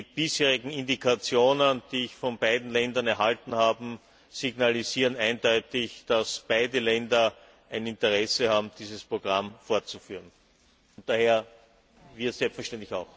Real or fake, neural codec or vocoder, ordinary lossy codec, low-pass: real; none; none; none